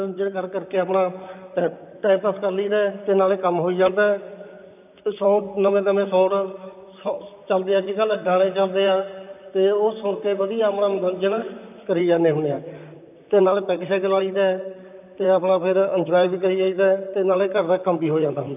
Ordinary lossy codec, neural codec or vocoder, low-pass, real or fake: none; vocoder, 44.1 kHz, 128 mel bands, Pupu-Vocoder; 3.6 kHz; fake